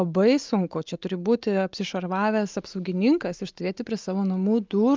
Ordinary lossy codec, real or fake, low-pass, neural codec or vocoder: Opus, 32 kbps; real; 7.2 kHz; none